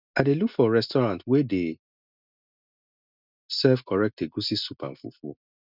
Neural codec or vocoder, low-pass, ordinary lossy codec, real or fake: none; 5.4 kHz; none; real